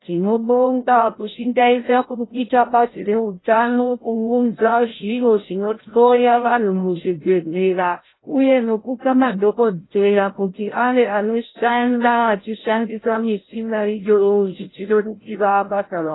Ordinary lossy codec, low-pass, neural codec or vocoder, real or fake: AAC, 16 kbps; 7.2 kHz; codec, 16 kHz, 0.5 kbps, FreqCodec, larger model; fake